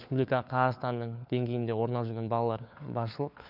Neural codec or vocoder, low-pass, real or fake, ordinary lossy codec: codec, 16 kHz, 4 kbps, FunCodec, trained on LibriTTS, 50 frames a second; 5.4 kHz; fake; none